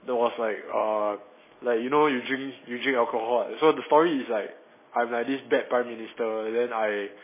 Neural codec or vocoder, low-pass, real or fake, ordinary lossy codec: none; 3.6 kHz; real; MP3, 16 kbps